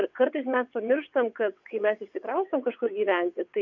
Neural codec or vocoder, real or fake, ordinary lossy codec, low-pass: vocoder, 22.05 kHz, 80 mel bands, WaveNeXt; fake; MP3, 64 kbps; 7.2 kHz